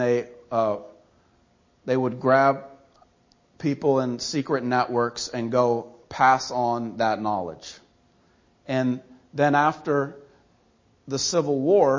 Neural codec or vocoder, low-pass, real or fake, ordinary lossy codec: none; 7.2 kHz; real; MP3, 32 kbps